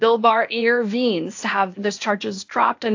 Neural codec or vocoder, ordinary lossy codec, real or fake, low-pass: codec, 16 kHz, 0.8 kbps, ZipCodec; AAC, 48 kbps; fake; 7.2 kHz